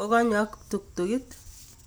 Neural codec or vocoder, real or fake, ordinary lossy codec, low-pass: none; real; none; none